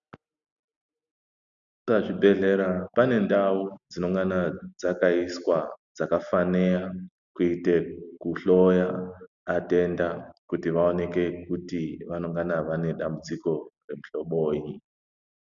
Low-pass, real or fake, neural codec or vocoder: 7.2 kHz; real; none